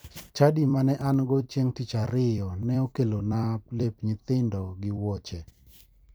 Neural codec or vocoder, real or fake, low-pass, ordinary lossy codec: vocoder, 44.1 kHz, 128 mel bands every 256 samples, BigVGAN v2; fake; none; none